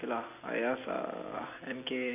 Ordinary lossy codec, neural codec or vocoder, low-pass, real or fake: none; none; 3.6 kHz; real